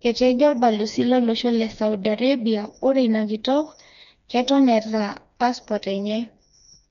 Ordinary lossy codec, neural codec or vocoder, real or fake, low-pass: none; codec, 16 kHz, 2 kbps, FreqCodec, smaller model; fake; 7.2 kHz